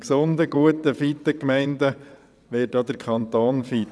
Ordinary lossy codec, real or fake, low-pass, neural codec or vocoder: none; fake; none; vocoder, 22.05 kHz, 80 mel bands, WaveNeXt